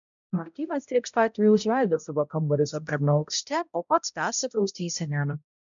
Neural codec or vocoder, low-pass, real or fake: codec, 16 kHz, 0.5 kbps, X-Codec, HuBERT features, trained on balanced general audio; 7.2 kHz; fake